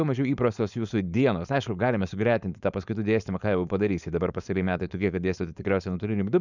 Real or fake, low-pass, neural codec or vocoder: fake; 7.2 kHz; codec, 16 kHz, 4.8 kbps, FACodec